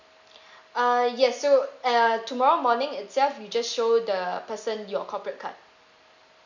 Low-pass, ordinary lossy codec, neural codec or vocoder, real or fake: 7.2 kHz; none; none; real